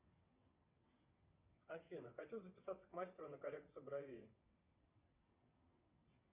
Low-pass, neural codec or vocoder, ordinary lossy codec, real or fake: 3.6 kHz; vocoder, 22.05 kHz, 80 mel bands, WaveNeXt; Opus, 64 kbps; fake